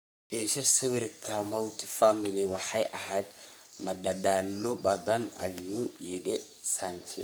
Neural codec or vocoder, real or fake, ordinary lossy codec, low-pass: codec, 44.1 kHz, 3.4 kbps, Pupu-Codec; fake; none; none